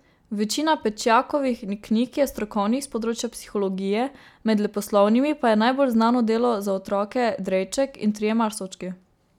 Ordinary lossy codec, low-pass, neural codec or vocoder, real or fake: none; 19.8 kHz; none; real